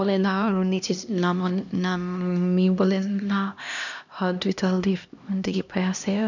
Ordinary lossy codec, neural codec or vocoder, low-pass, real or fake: none; codec, 16 kHz, 1 kbps, X-Codec, HuBERT features, trained on LibriSpeech; 7.2 kHz; fake